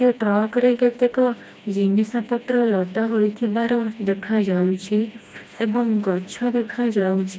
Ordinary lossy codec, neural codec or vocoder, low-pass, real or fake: none; codec, 16 kHz, 1 kbps, FreqCodec, smaller model; none; fake